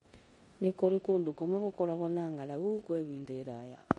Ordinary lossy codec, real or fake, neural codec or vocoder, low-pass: MP3, 48 kbps; fake; codec, 16 kHz in and 24 kHz out, 0.9 kbps, LongCat-Audio-Codec, four codebook decoder; 10.8 kHz